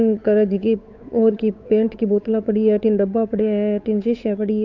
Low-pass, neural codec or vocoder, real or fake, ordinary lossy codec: 7.2 kHz; codec, 16 kHz, 8 kbps, FunCodec, trained on Chinese and English, 25 frames a second; fake; none